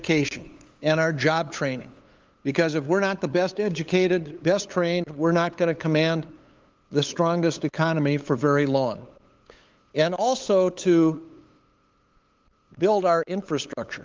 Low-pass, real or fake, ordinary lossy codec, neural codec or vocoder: 7.2 kHz; fake; Opus, 32 kbps; codec, 16 kHz, 8 kbps, FunCodec, trained on LibriTTS, 25 frames a second